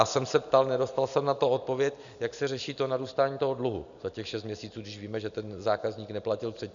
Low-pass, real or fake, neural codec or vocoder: 7.2 kHz; real; none